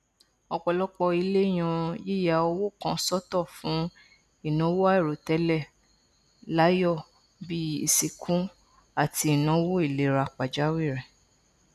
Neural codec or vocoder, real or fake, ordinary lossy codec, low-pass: none; real; none; 14.4 kHz